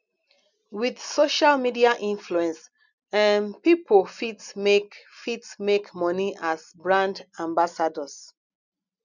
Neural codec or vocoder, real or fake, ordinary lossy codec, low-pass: none; real; none; 7.2 kHz